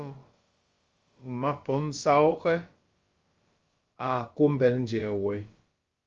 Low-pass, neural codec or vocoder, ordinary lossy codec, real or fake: 7.2 kHz; codec, 16 kHz, about 1 kbps, DyCAST, with the encoder's durations; Opus, 32 kbps; fake